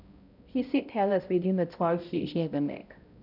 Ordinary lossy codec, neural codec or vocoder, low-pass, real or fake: none; codec, 16 kHz, 0.5 kbps, X-Codec, HuBERT features, trained on balanced general audio; 5.4 kHz; fake